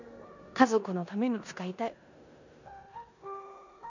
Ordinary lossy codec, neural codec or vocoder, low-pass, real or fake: none; codec, 16 kHz in and 24 kHz out, 0.9 kbps, LongCat-Audio-Codec, four codebook decoder; 7.2 kHz; fake